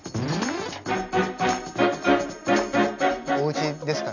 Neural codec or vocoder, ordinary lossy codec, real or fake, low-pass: none; none; real; 7.2 kHz